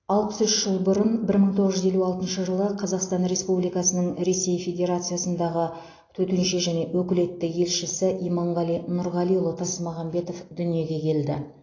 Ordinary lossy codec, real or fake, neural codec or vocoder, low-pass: AAC, 32 kbps; real; none; 7.2 kHz